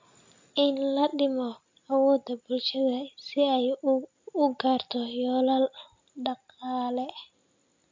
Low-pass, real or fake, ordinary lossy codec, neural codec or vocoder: 7.2 kHz; real; MP3, 48 kbps; none